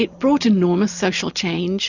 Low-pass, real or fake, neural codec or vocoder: 7.2 kHz; real; none